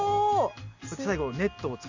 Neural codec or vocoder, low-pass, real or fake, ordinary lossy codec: none; 7.2 kHz; real; Opus, 64 kbps